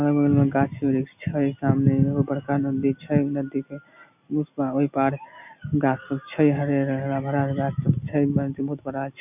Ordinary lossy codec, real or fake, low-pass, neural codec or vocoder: none; real; 3.6 kHz; none